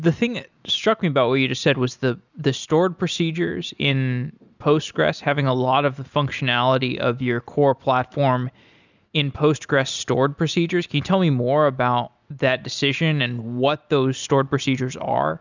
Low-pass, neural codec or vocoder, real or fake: 7.2 kHz; none; real